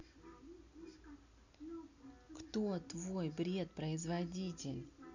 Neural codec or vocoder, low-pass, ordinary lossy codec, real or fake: none; 7.2 kHz; none; real